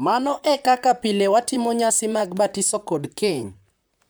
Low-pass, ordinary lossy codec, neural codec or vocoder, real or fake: none; none; vocoder, 44.1 kHz, 128 mel bands, Pupu-Vocoder; fake